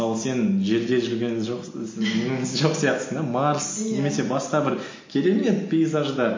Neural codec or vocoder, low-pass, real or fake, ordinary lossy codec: none; 7.2 kHz; real; MP3, 32 kbps